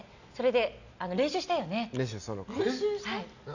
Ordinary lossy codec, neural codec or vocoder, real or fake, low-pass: none; none; real; 7.2 kHz